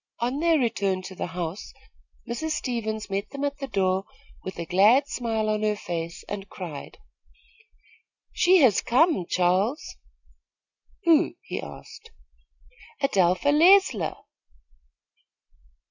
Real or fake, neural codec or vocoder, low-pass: real; none; 7.2 kHz